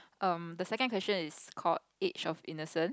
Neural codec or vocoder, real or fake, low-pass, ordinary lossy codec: none; real; none; none